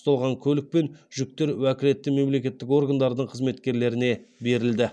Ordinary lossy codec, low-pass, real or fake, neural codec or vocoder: none; none; real; none